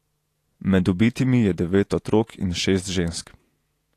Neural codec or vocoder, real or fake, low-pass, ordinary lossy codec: vocoder, 48 kHz, 128 mel bands, Vocos; fake; 14.4 kHz; AAC, 64 kbps